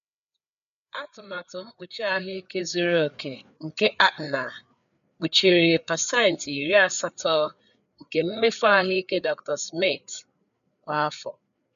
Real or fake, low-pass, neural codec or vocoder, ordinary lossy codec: fake; 7.2 kHz; codec, 16 kHz, 4 kbps, FreqCodec, larger model; none